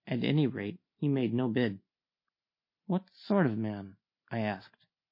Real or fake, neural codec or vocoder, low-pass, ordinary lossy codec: real; none; 5.4 kHz; MP3, 32 kbps